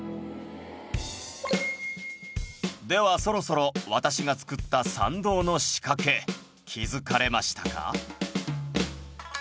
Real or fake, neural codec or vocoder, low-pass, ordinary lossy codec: real; none; none; none